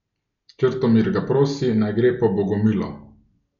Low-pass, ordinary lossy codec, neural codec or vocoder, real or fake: 7.2 kHz; MP3, 64 kbps; none; real